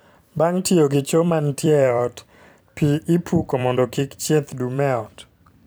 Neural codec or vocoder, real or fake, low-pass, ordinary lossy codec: vocoder, 44.1 kHz, 128 mel bands every 256 samples, BigVGAN v2; fake; none; none